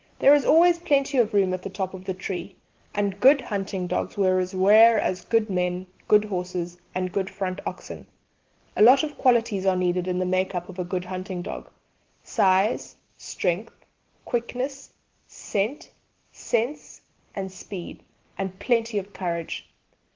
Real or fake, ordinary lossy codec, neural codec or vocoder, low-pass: real; Opus, 16 kbps; none; 7.2 kHz